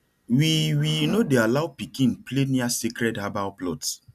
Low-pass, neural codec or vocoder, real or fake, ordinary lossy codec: 14.4 kHz; none; real; none